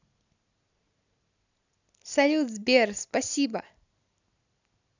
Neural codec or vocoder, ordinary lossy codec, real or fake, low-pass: none; none; real; 7.2 kHz